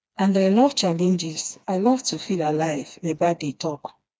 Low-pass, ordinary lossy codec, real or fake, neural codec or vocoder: none; none; fake; codec, 16 kHz, 2 kbps, FreqCodec, smaller model